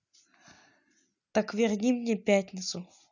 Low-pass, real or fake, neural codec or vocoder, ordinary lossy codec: 7.2 kHz; real; none; none